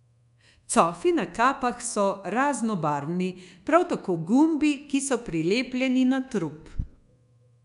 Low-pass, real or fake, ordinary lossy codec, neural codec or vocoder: 10.8 kHz; fake; none; codec, 24 kHz, 1.2 kbps, DualCodec